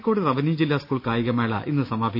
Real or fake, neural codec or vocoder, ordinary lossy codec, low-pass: real; none; none; 5.4 kHz